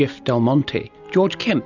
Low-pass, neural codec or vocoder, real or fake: 7.2 kHz; none; real